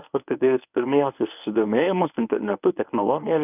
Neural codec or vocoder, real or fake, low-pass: codec, 24 kHz, 0.9 kbps, WavTokenizer, medium speech release version 1; fake; 3.6 kHz